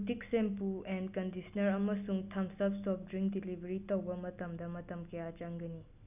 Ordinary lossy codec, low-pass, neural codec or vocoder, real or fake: none; 3.6 kHz; none; real